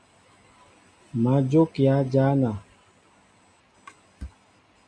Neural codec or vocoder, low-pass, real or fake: none; 9.9 kHz; real